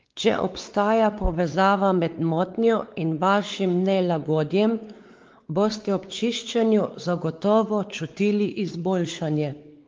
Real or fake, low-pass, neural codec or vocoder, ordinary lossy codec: fake; 7.2 kHz; codec, 16 kHz, 4 kbps, X-Codec, WavLM features, trained on Multilingual LibriSpeech; Opus, 32 kbps